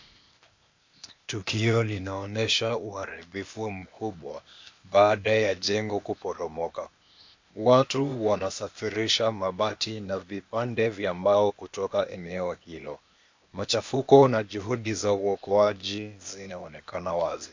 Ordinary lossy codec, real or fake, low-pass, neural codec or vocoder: MP3, 64 kbps; fake; 7.2 kHz; codec, 16 kHz, 0.8 kbps, ZipCodec